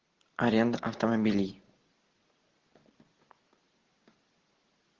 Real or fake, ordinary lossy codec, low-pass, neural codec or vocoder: real; Opus, 16 kbps; 7.2 kHz; none